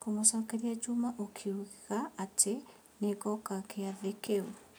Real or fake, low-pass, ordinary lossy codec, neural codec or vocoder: real; none; none; none